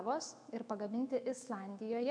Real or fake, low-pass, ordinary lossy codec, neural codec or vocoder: fake; 9.9 kHz; MP3, 64 kbps; autoencoder, 48 kHz, 128 numbers a frame, DAC-VAE, trained on Japanese speech